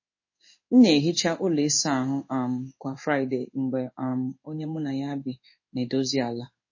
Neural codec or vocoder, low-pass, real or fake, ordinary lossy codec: codec, 16 kHz in and 24 kHz out, 1 kbps, XY-Tokenizer; 7.2 kHz; fake; MP3, 32 kbps